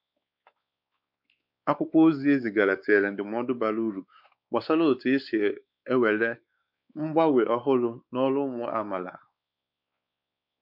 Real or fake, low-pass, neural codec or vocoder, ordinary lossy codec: fake; 5.4 kHz; codec, 16 kHz, 4 kbps, X-Codec, WavLM features, trained on Multilingual LibriSpeech; MP3, 48 kbps